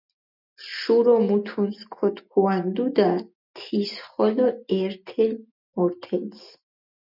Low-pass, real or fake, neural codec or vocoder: 5.4 kHz; real; none